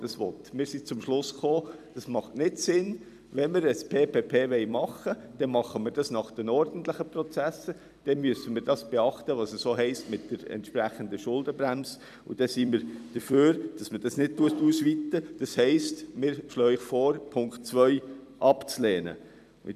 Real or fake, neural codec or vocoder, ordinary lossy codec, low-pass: fake; vocoder, 44.1 kHz, 128 mel bands every 256 samples, BigVGAN v2; none; 14.4 kHz